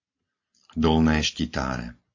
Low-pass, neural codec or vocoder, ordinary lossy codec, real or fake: 7.2 kHz; none; AAC, 48 kbps; real